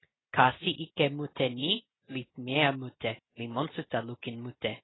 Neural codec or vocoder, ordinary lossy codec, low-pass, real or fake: none; AAC, 16 kbps; 7.2 kHz; real